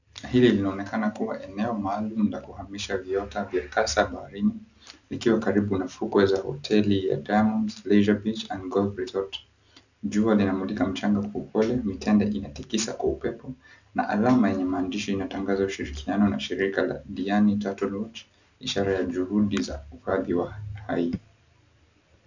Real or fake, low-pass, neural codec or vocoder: real; 7.2 kHz; none